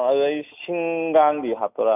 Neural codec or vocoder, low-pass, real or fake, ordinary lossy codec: none; 3.6 kHz; real; none